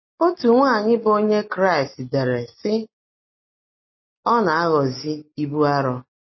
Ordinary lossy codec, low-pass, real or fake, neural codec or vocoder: MP3, 24 kbps; 7.2 kHz; real; none